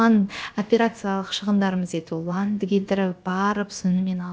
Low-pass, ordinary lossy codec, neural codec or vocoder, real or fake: none; none; codec, 16 kHz, about 1 kbps, DyCAST, with the encoder's durations; fake